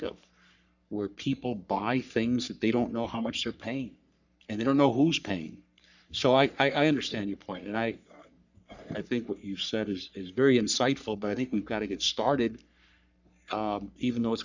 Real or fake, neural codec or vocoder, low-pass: fake; codec, 44.1 kHz, 3.4 kbps, Pupu-Codec; 7.2 kHz